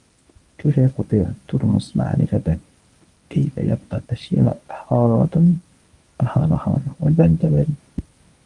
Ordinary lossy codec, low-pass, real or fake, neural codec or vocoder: Opus, 32 kbps; 10.8 kHz; fake; codec, 24 kHz, 0.9 kbps, WavTokenizer, medium speech release version 1